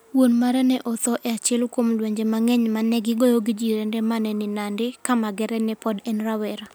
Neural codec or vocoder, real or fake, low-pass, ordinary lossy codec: none; real; none; none